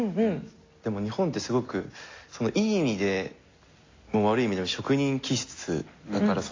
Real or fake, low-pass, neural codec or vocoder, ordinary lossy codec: real; 7.2 kHz; none; AAC, 32 kbps